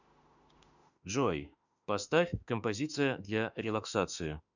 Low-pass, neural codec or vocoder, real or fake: 7.2 kHz; autoencoder, 48 kHz, 32 numbers a frame, DAC-VAE, trained on Japanese speech; fake